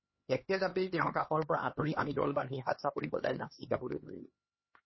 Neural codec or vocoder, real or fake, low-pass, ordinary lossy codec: codec, 16 kHz, 2 kbps, X-Codec, HuBERT features, trained on LibriSpeech; fake; 7.2 kHz; MP3, 24 kbps